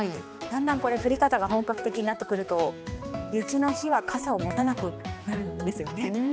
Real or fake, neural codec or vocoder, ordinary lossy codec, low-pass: fake; codec, 16 kHz, 2 kbps, X-Codec, HuBERT features, trained on balanced general audio; none; none